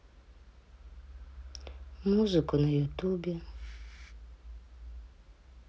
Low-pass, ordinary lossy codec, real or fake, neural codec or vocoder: none; none; real; none